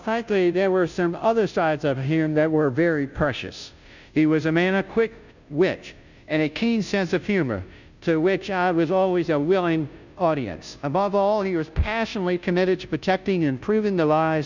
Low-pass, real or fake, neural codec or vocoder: 7.2 kHz; fake; codec, 16 kHz, 0.5 kbps, FunCodec, trained on Chinese and English, 25 frames a second